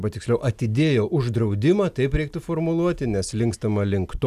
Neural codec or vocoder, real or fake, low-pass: none; real; 14.4 kHz